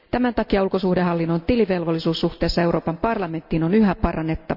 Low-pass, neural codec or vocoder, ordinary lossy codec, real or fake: 5.4 kHz; none; none; real